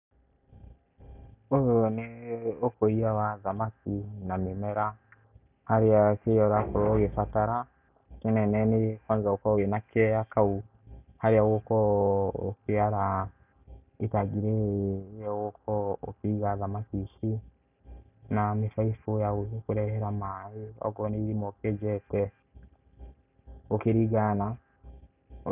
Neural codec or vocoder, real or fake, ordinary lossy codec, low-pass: none; real; none; 3.6 kHz